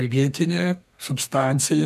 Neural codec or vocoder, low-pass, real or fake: codec, 32 kHz, 1.9 kbps, SNAC; 14.4 kHz; fake